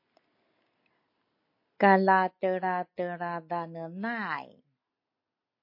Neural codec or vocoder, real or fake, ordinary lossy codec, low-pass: none; real; MP3, 32 kbps; 5.4 kHz